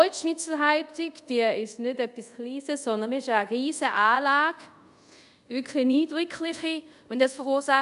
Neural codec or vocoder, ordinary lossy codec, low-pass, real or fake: codec, 24 kHz, 0.5 kbps, DualCodec; none; 10.8 kHz; fake